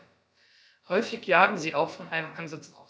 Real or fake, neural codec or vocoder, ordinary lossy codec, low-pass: fake; codec, 16 kHz, about 1 kbps, DyCAST, with the encoder's durations; none; none